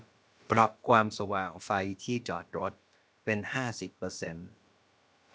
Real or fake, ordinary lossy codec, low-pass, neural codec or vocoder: fake; none; none; codec, 16 kHz, about 1 kbps, DyCAST, with the encoder's durations